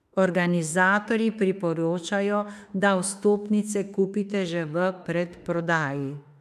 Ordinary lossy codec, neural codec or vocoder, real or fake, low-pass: none; autoencoder, 48 kHz, 32 numbers a frame, DAC-VAE, trained on Japanese speech; fake; 14.4 kHz